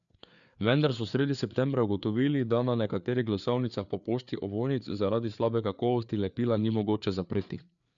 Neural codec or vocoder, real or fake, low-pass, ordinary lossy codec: codec, 16 kHz, 4 kbps, FreqCodec, larger model; fake; 7.2 kHz; none